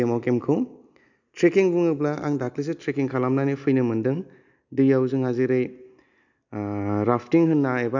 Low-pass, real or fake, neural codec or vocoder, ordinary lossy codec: 7.2 kHz; real; none; none